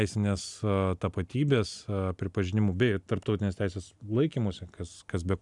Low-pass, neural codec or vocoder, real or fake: 10.8 kHz; none; real